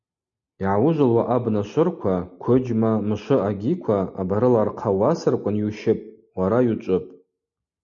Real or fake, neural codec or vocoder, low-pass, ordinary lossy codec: real; none; 7.2 kHz; MP3, 64 kbps